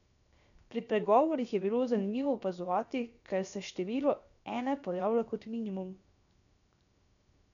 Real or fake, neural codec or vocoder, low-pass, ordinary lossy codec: fake; codec, 16 kHz, 0.7 kbps, FocalCodec; 7.2 kHz; none